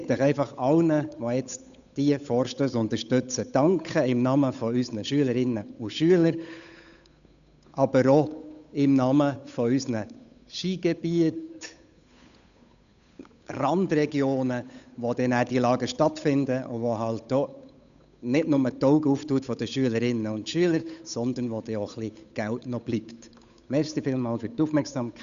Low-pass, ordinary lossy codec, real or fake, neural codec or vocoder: 7.2 kHz; none; fake; codec, 16 kHz, 8 kbps, FunCodec, trained on Chinese and English, 25 frames a second